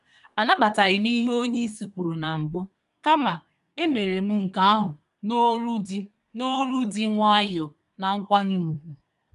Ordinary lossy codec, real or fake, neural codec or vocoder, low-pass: none; fake; codec, 24 kHz, 1 kbps, SNAC; 10.8 kHz